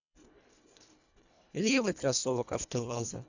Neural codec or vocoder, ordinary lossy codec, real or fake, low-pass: codec, 24 kHz, 1.5 kbps, HILCodec; none; fake; 7.2 kHz